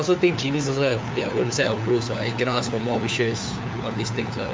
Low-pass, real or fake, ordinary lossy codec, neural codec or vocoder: none; fake; none; codec, 16 kHz, 4 kbps, FunCodec, trained on LibriTTS, 50 frames a second